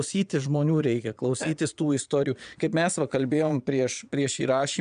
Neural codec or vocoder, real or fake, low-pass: vocoder, 22.05 kHz, 80 mel bands, Vocos; fake; 9.9 kHz